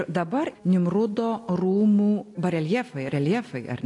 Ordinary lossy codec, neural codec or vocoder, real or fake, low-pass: AAC, 48 kbps; none; real; 10.8 kHz